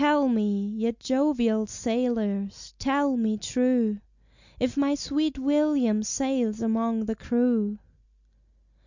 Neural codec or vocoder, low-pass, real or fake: none; 7.2 kHz; real